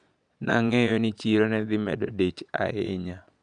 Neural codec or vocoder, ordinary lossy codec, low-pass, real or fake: vocoder, 22.05 kHz, 80 mel bands, Vocos; none; 9.9 kHz; fake